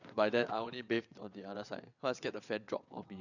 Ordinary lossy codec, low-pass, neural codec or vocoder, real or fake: none; 7.2 kHz; vocoder, 22.05 kHz, 80 mel bands, WaveNeXt; fake